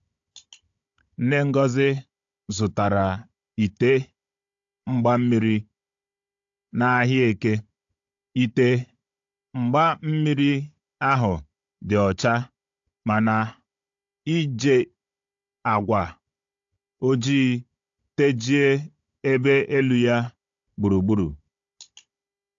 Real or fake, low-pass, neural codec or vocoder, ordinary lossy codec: fake; 7.2 kHz; codec, 16 kHz, 16 kbps, FunCodec, trained on Chinese and English, 50 frames a second; AAC, 64 kbps